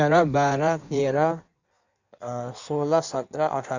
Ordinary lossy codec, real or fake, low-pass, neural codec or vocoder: none; fake; 7.2 kHz; codec, 16 kHz in and 24 kHz out, 1.1 kbps, FireRedTTS-2 codec